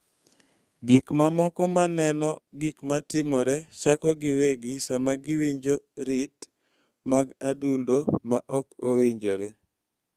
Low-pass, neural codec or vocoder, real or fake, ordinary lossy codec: 14.4 kHz; codec, 32 kHz, 1.9 kbps, SNAC; fake; Opus, 32 kbps